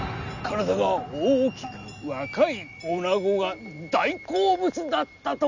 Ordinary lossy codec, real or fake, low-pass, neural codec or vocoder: none; real; 7.2 kHz; none